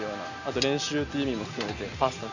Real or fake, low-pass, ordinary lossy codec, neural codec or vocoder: real; 7.2 kHz; none; none